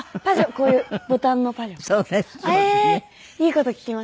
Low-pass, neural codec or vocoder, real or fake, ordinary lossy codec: none; none; real; none